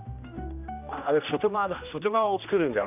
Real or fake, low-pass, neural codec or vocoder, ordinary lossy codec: fake; 3.6 kHz; codec, 16 kHz, 1 kbps, X-Codec, HuBERT features, trained on general audio; none